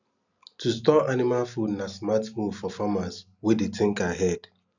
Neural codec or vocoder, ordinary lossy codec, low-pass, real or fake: none; none; 7.2 kHz; real